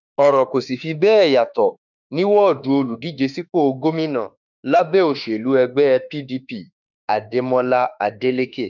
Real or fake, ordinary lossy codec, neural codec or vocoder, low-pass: fake; none; autoencoder, 48 kHz, 32 numbers a frame, DAC-VAE, trained on Japanese speech; 7.2 kHz